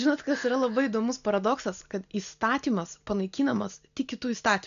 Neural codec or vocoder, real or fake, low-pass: none; real; 7.2 kHz